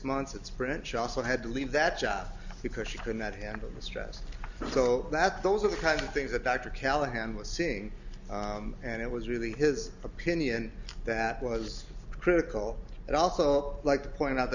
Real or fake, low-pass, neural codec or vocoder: real; 7.2 kHz; none